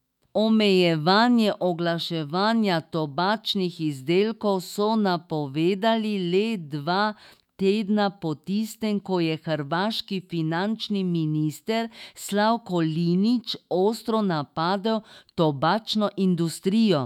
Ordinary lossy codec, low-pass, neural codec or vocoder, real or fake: none; 19.8 kHz; autoencoder, 48 kHz, 128 numbers a frame, DAC-VAE, trained on Japanese speech; fake